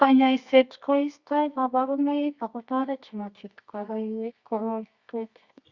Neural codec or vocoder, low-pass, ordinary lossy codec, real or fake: codec, 24 kHz, 0.9 kbps, WavTokenizer, medium music audio release; 7.2 kHz; Opus, 64 kbps; fake